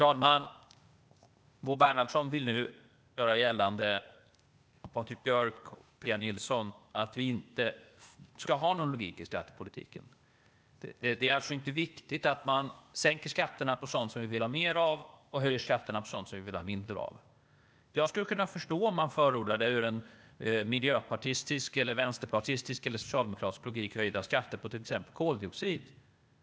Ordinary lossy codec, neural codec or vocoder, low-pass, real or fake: none; codec, 16 kHz, 0.8 kbps, ZipCodec; none; fake